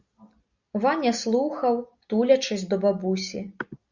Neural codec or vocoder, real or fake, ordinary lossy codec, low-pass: none; real; Opus, 64 kbps; 7.2 kHz